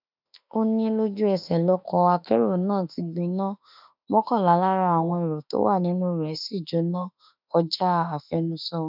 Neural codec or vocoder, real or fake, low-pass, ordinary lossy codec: autoencoder, 48 kHz, 32 numbers a frame, DAC-VAE, trained on Japanese speech; fake; 5.4 kHz; none